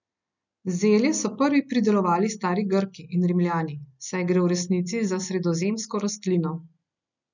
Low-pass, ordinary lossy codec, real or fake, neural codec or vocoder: 7.2 kHz; none; real; none